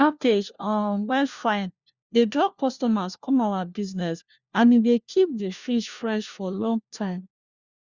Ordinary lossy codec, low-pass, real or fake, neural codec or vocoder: Opus, 64 kbps; 7.2 kHz; fake; codec, 16 kHz, 1 kbps, FunCodec, trained on LibriTTS, 50 frames a second